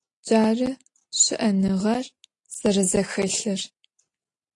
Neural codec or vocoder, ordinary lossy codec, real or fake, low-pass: none; AAC, 48 kbps; real; 10.8 kHz